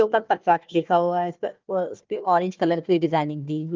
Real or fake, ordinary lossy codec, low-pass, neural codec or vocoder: fake; Opus, 32 kbps; 7.2 kHz; codec, 16 kHz, 1 kbps, FunCodec, trained on Chinese and English, 50 frames a second